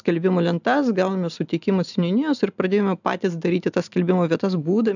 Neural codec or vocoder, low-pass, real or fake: none; 7.2 kHz; real